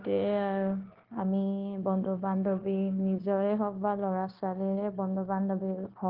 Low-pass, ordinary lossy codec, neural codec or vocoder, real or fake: 5.4 kHz; Opus, 16 kbps; codec, 16 kHz, 0.9 kbps, LongCat-Audio-Codec; fake